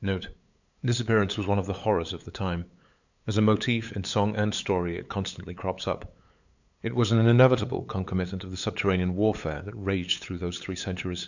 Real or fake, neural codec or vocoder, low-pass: fake; codec, 16 kHz, 8 kbps, FunCodec, trained on LibriTTS, 25 frames a second; 7.2 kHz